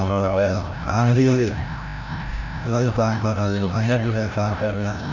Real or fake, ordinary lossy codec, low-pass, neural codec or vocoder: fake; none; 7.2 kHz; codec, 16 kHz, 0.5 kbps, FreqCodec, larger model